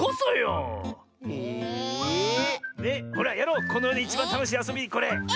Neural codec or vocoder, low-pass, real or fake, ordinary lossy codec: none; none; real; none